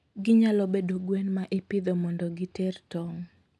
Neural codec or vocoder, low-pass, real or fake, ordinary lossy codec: none; none; real; none